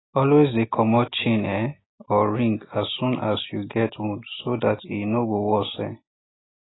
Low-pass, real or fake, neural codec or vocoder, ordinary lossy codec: 7.2 kHz; real; none; AAC, 16 kbps